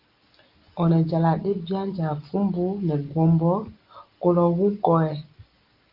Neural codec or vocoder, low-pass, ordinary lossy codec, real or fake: none; 5.4 kHz; Opus, 32 kbps; real